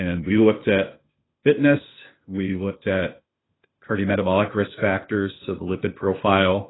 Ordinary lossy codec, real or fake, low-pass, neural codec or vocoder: AAC, 16 kbps; fake; 7.2 kHz; codec, 16 kHz, 0.8 kbps, ZipCodec